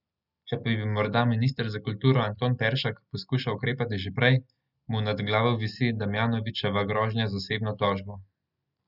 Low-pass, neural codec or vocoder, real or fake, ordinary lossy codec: 5.4 kHz; none; real; none